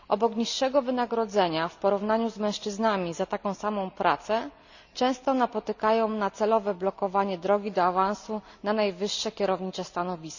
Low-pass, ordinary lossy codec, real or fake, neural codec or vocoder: 7.2 kHz; none; real; none